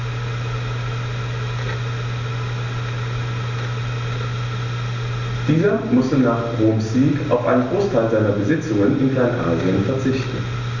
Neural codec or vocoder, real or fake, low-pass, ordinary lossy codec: none; real; 7.2 kHz; none